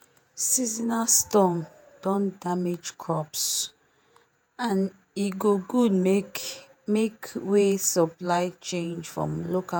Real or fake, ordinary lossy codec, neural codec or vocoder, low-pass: fake; none; vocoder, 48 kHz, 128 mel bands, Vocos; none